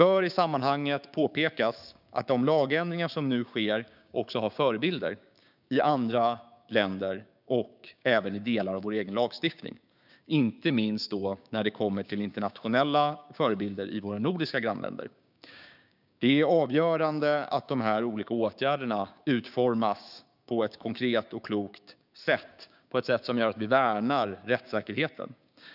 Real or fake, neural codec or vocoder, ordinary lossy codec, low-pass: fake; codec, 16 kHz, 6 kbps, DAC; none; 5.4 kHz